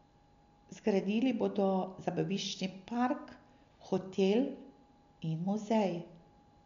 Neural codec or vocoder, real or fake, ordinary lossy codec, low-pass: none; real; MP3, 64 kbps; 7.2 kHz